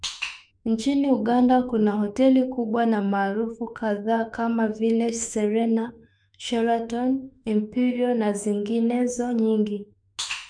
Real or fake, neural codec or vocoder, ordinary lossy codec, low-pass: fake; autoencoder, 48 kHz, 32 numbers a frame, DAC-VAE, trained on Japanese speech; none; 9.9 kHz